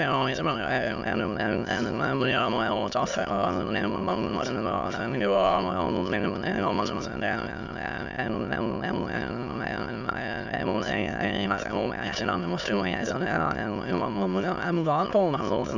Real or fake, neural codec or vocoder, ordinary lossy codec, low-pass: fake; autoencoder, 22.05 kHz, a latent of 192 numbers a frame, VITS, trained on many speakers; none; 7.2 kHz